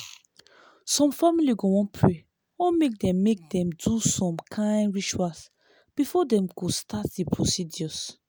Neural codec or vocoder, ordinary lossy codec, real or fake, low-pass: none; none; real; none